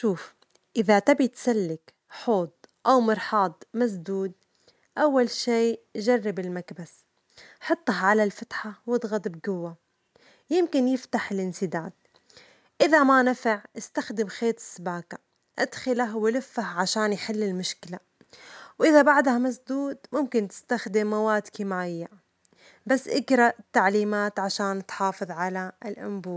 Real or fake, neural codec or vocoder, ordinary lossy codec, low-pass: real; none; none; none